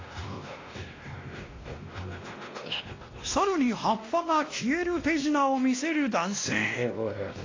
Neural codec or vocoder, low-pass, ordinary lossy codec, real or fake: codec, 16 kHz, 1 kbps, X-Codec, WavLM features, trained on Multilingual LibriSpeech; 7.2 kHz; AAC, 32 kbps; fake